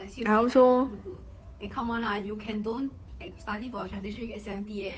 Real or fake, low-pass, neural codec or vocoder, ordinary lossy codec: fake; none; codec, 16 kHz, 2 kbps, FunCodec, trained on Chinese and English, 25 frames a second; none